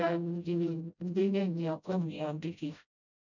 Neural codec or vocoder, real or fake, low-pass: codec, 16 kHz, 0.5 kbps, FreqCodec, smaller model; fake; 7.2 kHz